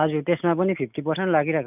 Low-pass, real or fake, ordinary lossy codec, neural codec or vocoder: 3.6 kHz; real; none; none